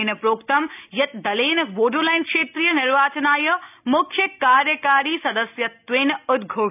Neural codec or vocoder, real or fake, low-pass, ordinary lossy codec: none; real; 3.6 kHz; none